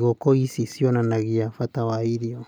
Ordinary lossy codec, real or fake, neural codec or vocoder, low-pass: none; real; none; none